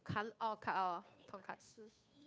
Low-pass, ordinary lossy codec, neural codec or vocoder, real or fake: none; none; codec, 16 kHz, 2 kbps, FunCodec, trained on Chinese and English, 25 frames a second; fake